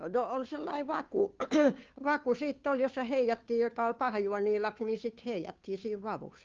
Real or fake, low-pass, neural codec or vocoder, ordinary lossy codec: fake; 7.2 kHz; codec, 16 kHz, 2 kbps, FunCodec, trained on Chinese and English, 25 frames a second; Opus, 24 kbps